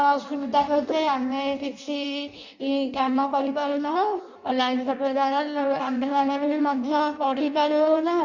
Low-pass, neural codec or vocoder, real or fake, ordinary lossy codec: 7.2 kHz; codec, 16 kHz in and 24 kHz out, 0.6 kbps, FireRedTTS-2 codec; fake; Opus, 64 kbps